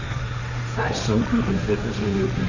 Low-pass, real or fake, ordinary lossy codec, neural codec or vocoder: 7.2 kHz; fake; none; codec, 16 kHz, 1.1 kbps, Voila-Tokenizer